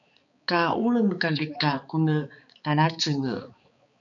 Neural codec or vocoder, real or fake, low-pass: codec, 16 kHz, 4 kbps, X-Codec, HuBERT features, trained on balanced general audio; fake; 7.2 kHz